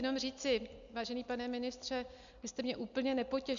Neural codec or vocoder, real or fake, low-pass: none; real; 7.2 kHz